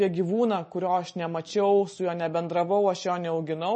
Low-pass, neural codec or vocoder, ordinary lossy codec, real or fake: 10.8 kHz; none; MP3, 32 kbps; real